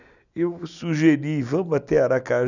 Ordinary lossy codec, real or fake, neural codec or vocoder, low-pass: none; real; none; 7.2 kHz